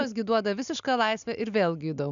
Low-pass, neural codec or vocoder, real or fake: 7.2 kHz; none; real